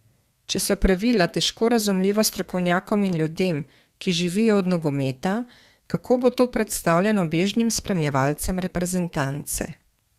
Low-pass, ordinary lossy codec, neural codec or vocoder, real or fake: 14.4 kHz; Opus, 64 kbps; codec, 32 kHz, 1.9 kbps, SNAC; fake